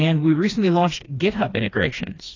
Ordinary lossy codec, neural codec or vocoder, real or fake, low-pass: AAC, 32 kbps; codec, 16 kHz, 2 kbps, FreqCodec, smaller model; fake; 7.2 kHz